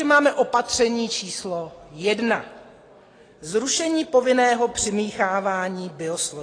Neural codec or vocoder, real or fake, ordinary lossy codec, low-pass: none; real; AAC, 32 kbps; 9.9 kHz